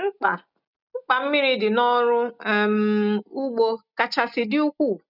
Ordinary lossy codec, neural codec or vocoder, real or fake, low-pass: none; none; real; 5.4 kHz